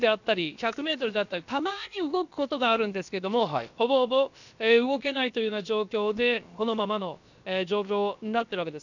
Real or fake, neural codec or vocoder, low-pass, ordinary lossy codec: fake; codec, 16 kHz, about 1 kbps, DyCAST, with the encoder's durations; 7.2 kHz; none